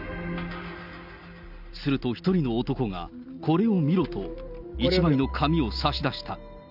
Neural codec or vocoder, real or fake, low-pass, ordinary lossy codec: none; real; 5.4 kHz; none